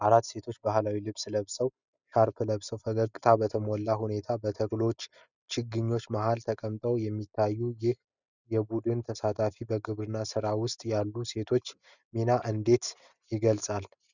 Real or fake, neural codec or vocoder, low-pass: real; none; 7.2 kHz